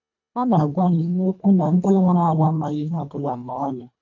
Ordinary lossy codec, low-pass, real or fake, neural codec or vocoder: MP3, 64 kbps; 7.2 kHz; fake; codec, 24 kHz, 1.5 kbps, HILCodec